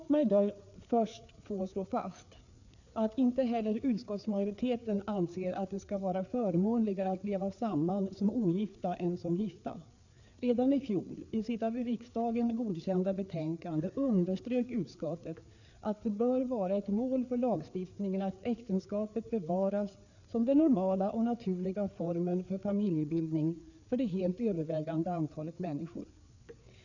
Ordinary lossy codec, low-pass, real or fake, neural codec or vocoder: none; 7.2 kHz; fake; codec, 16 kHz, 4 kbps, FreqCodec, larger model